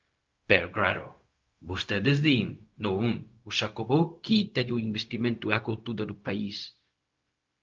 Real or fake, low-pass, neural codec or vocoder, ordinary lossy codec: fake; 7.2 kHz; codec, 16 kHz, 0.4 kbps, LongCat-Audio-Codec; Opus, 24 kbps